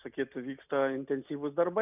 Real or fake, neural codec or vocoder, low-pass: real; none; 3.6 kHz